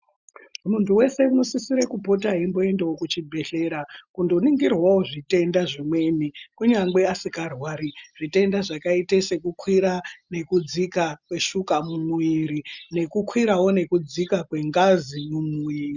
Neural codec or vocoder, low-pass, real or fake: none; 7.2 kHz; real